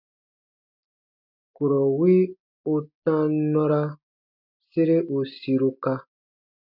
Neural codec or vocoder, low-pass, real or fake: none; 5.4 kHz; real